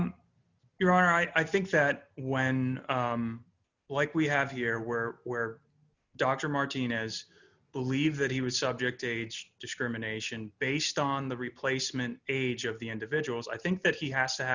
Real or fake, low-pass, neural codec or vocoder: real; 7.2 kHz; none